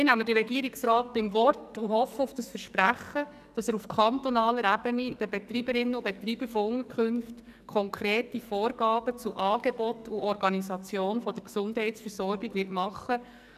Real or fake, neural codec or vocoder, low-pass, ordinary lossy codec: fake; codec, 44.1 kHz, 2.6 kbps, SNAC; 14.4 kHz; none